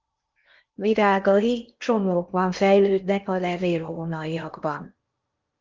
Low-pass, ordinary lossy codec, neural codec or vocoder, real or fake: 7.2 kHz; Opus, 32 kbps; codec, 16 kHz in and 24 kHz out, 0.8 kbps, FocalCodec, streaming, 65536 codes; fake